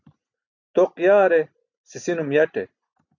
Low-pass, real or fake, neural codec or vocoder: 7.2 kHz; real; none